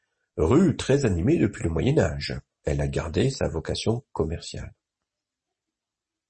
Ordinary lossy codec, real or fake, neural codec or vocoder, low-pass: MP3, 32 kbps; real; none; 10.8 kHz